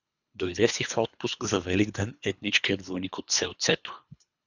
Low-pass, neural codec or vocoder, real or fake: 7.2 kHz; codec, 24 kHz, 3 kbps, HILCodec; fake